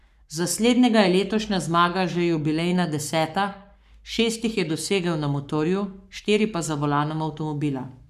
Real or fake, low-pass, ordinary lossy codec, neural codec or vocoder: fake; 14.4 kHz; none; codec, 44.1 kHz, 7.8 kbps, Pupu-Codec